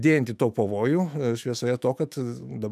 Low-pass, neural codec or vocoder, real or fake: 14.4 kHz; autoencoder, 48 kHz, 128 numbers a frame, DAC-VAE, trained on Japanese speech; fake